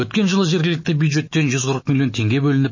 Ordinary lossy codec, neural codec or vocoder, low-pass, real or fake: MP3, 32 kbps; autoencoder, 48 kHz, 128 numbers a frame, DAC-VAE, trained on Japanese speech; 7.2 kHz; fake